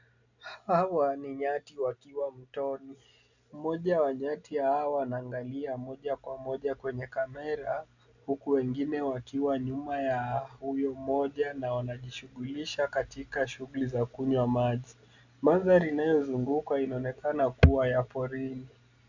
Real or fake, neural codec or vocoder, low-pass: real; none; 7.2 kHz